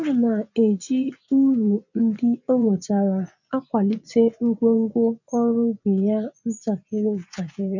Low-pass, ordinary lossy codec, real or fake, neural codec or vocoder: 7.2 kHz; none; fake; vocoder, 24 kHz, 100 mel bands, Vocos